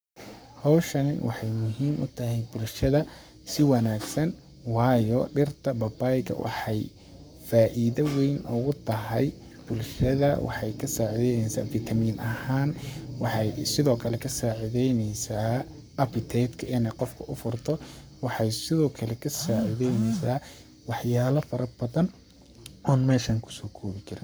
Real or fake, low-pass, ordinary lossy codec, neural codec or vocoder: fake; none; none; codec, 44.1 kHz, 7.8 kbps, Pupu-Codec